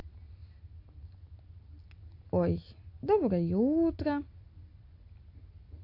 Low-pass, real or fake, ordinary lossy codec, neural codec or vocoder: 5.4 kHz; real; AAC, 32 kbps; none